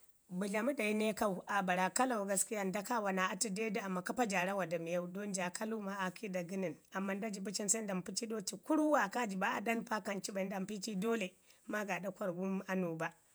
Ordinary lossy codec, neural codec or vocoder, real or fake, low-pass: none; vocoder, 48 kHz, 128 mel bands, Vocos; fake; none